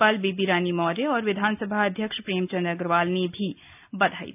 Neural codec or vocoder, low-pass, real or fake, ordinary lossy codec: none; 3.6 kHz; real; none